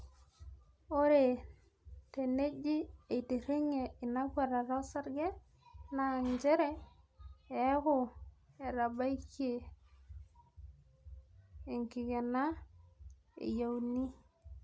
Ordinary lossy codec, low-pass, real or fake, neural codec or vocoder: none; none; real; none